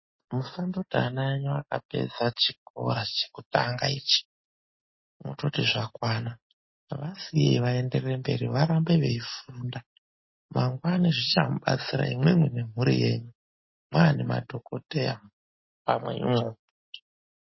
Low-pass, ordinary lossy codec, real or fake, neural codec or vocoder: 7.2 kHz; MP3, 24 kbps; real; none